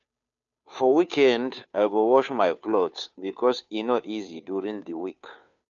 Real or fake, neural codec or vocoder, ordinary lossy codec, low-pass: fake; codec, 16 kHz, 2 kbps, FunCodec, trained on Chinese and English, 25 frames a second; none; 7.2 kHz